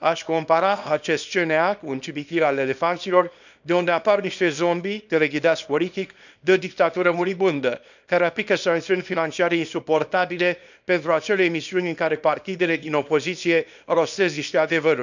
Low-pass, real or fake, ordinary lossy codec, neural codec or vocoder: 7.2 kHz; fake; none; codec, 24 kHz, 0.9 kbps, WavTokenizer, small release